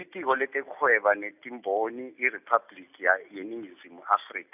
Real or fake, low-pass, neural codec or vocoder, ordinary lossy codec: real; 3.6 kHz; none; none